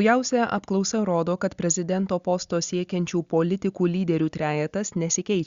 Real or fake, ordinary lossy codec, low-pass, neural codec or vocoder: real; Opus, 64 kbps; 7.2 kHz; none